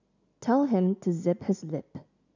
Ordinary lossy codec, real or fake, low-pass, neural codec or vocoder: none; real; 7.2 kHz; none